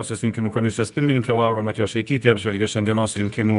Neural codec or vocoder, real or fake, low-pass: codec, 24 kHz, 0.9 kbps, WavTokenizer, medium music audio release; fake; 10.8 kHz